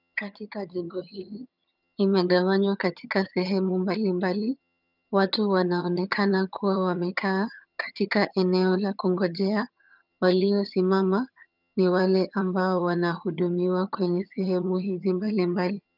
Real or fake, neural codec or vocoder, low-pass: fake; vocoder, 22.05 kHz, 80 mel bands, HiFi-GAN; 5.4 kHz